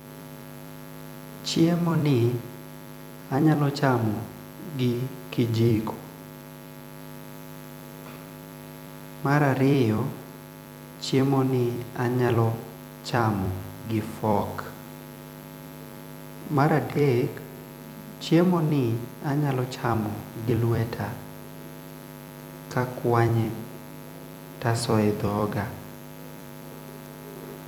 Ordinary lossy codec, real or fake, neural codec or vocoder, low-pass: none; fake; vocoder, 44.1 kHz, 128 mel bands every 256 samples, BigVGAN v2; none